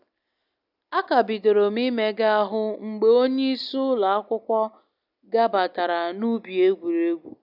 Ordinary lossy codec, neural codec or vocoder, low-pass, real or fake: none; none; 5.4 kHz; real